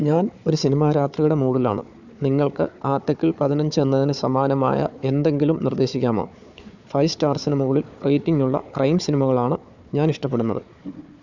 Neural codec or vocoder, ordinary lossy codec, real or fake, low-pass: codec, 16 kHz, 4 kbps, FunCodec, trained on Chinese and English, 50 frames a second; none; fake; 7.2 kHz